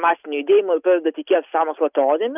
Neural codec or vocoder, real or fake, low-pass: none; real; 3.6 kHz